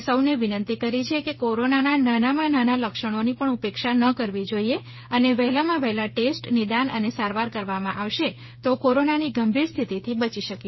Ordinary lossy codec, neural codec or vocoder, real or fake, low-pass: MP3, 24 kbps; codec, 16 kHz, 8 kbps, FreqCodec, smaller model; fake; 7.2 kHz